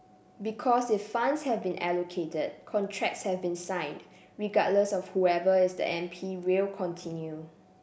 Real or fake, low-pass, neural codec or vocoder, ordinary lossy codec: real; none; none; none